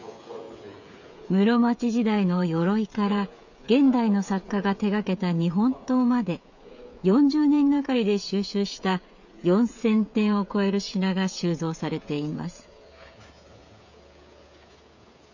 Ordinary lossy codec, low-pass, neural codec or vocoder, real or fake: none; 7.2 kHz; codec, 16 kHz, 16 kbps, FreqCodec, smaller model; fake